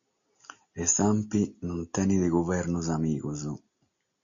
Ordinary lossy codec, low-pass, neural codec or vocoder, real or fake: AAC, 64 kbps; 7.2 kHz; none; real